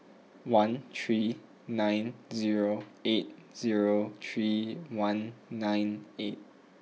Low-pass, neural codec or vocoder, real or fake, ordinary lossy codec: none; none; real; none